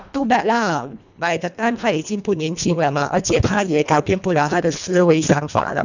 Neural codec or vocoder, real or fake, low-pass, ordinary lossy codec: codec, 24 kHz, 1.5 kbps, HILCodec; fake; 7.2 kHz; none